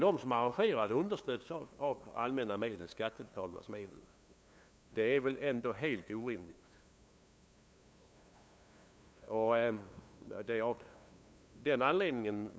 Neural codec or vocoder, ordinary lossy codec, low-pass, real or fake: codec, 16 kHz, 2 kbps, FunCodec, trained on LibriTTS, 25 frames a second; none; none; fake